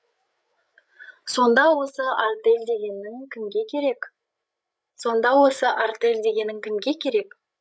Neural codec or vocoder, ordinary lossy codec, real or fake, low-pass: codec, 16 kHz, 8 kbps, FreqCodec, larger model; none; fake; none